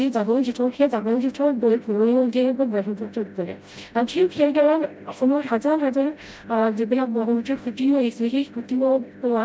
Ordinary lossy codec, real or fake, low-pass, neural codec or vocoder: none; fake; none; codec, 16 kHz, 0.5 kbps, FreqCodec, smaller model